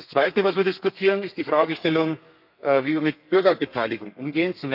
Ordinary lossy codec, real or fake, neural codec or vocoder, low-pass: none; fake; codec, 32 kHz, 1.9 kbps, SNAC; 5.4 kHz